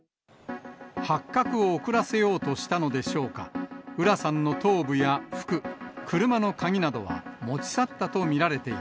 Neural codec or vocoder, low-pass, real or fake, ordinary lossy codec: none; none; real; none